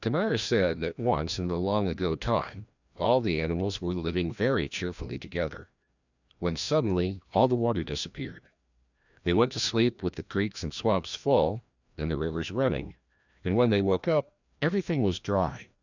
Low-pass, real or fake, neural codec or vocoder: 7.2 kHz; fake; codec, 16 kHz, 1 kbps, FreqCodec, larger model